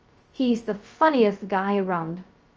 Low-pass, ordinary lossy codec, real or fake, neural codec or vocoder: 7.2 kHz; Opus, 24 kbps; fake; codec, 16 kHz, 0.2 kbps, FocalCodec